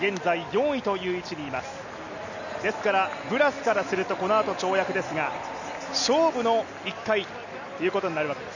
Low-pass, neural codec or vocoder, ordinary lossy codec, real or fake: 7.2 kHz; none; none; real